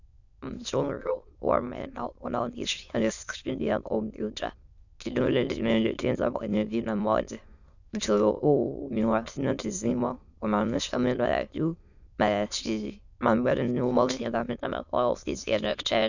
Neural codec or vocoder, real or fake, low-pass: autoencoder, 22.05 kHz, a latent of 192 numbers a frame, VITS, trained on many speakers; fake; 7.2 kHz